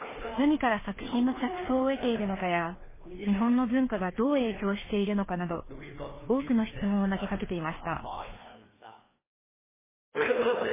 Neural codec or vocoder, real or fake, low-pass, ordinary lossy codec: codec, 16 kHz, 2 kbps, FreqCodec, larger model; fake; 3.6 kHz; MP3, 16 kbps